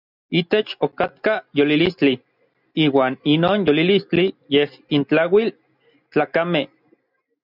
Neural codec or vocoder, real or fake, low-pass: none; real; 5.4 kHz